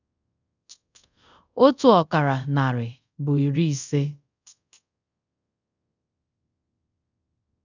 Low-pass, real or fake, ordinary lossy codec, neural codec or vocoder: 7.2 kHz; fake; none; codec, 24 kHz, 0.5 kbps, DualCodec